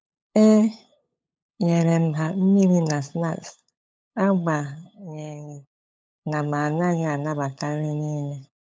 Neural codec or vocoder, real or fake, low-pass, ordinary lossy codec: codec, 16 kHz, 8 kbps, FunCodec, trained on LibriTTS, 25 frames a second; fake; none; none